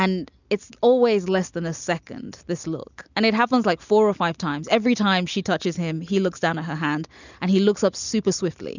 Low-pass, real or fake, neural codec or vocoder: 7.2 kHz; real; none